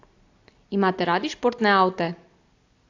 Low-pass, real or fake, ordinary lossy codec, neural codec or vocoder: 7.2 kHz; real; AAC, 48 kbps; none